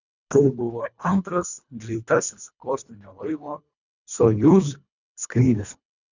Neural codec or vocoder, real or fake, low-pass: codec, 24 kHz, 1.5 kbps, HILCodec; fake; 7.2 kHz